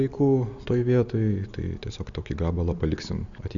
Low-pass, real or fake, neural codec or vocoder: 7.2 kHz; real; none